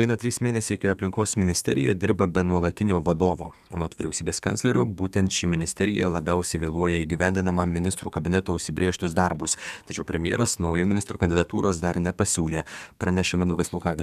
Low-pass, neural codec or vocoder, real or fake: 14.4 kHz; codec, 32 kHz, 1.9 kbps, SNAC; fake